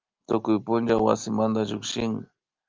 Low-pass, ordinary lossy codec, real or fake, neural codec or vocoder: 7.2 kHz; Opus, 24 kbps; real; none